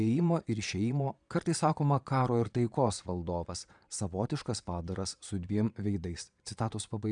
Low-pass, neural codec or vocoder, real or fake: 9.9 kHz; vocoder, 22.05 kHz, 80 mel bands, Vocos; fake